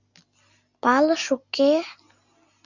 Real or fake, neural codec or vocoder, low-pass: real; none; 7.2 kHz